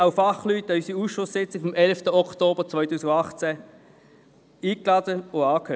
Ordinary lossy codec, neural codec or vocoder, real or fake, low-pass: none; none; real; none